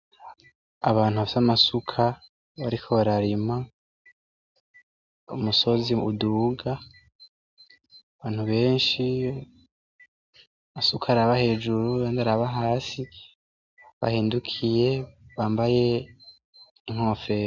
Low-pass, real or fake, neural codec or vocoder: 7.2 kHz; real; none